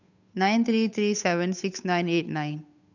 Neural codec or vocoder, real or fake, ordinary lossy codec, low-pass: codec, 16 kHz, 8 kbps, FunCodec, trained on Chinese and English, 25 frames a second; fake; none; 7.2 kHz